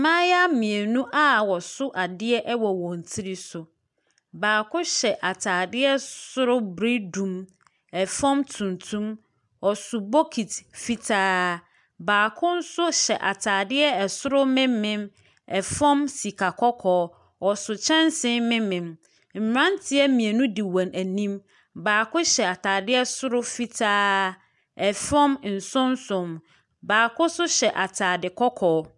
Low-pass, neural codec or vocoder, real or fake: 10.8 kHz; none; real